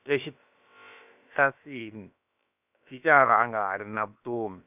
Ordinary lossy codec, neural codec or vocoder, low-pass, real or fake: none; codec, 16 kHz, about 1 kbps, DyCAST, with the encoder's durations; 3.6 kHz; fake